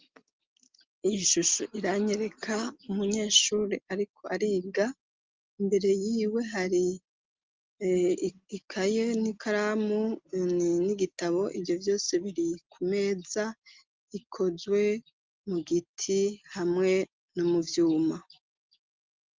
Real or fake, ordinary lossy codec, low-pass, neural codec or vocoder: real; Opus, 32 kbps; 7.2 kHz; none